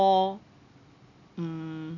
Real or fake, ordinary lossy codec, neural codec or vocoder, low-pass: real; none; none; 7.2 kHz